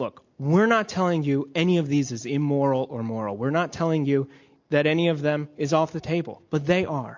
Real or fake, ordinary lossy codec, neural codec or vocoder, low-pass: real; MP3, 48 kbps; none; 7.2 kHz